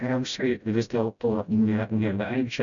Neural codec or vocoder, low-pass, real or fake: codec, 16 kHz, 0.5 kbps, FreqCodec, smaller model; 7.2 kHz; fake